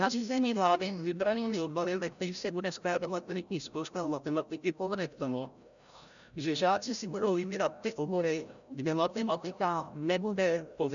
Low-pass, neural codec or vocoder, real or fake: 7.2 kHz; codec, 16 kHz, 0.5 kbps, FreqCodec, larger model; fake